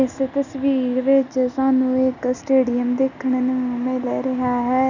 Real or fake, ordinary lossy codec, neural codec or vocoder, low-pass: real; none; none; 7.2 kHz